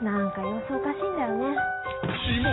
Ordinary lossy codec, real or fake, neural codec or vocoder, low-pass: AAC, 16 kbps; real; none; 7.2 kHz